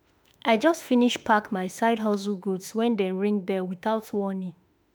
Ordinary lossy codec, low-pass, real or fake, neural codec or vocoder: none; none; fake; autoencoder, 48 kHz, 32 numbers a frame, DAC-VAE, trained on Japanese speech